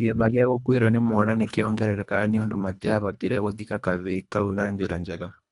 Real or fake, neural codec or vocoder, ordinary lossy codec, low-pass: fake; codec, 24 kHz, 1.5 kbps, HILCodec; none; 10.8 kHz